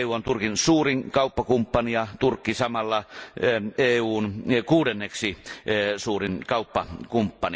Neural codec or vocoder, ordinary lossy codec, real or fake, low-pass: none; none; real; none